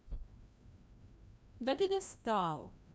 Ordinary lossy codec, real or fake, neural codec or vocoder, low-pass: none; fake; codec, 16 kHz, 1 kbps, FreqCodec, larger model; none